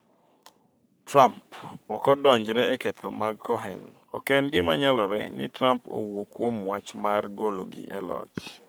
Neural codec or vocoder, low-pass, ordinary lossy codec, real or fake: codec, 44.1 kHz, 3.4 kbps, Pupu-Codec; none; none; fake